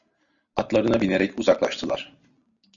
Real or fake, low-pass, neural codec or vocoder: real; 7.2 kHz; none